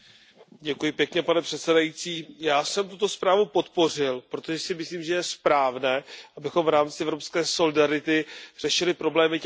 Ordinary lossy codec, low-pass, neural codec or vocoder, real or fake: none; none; none; real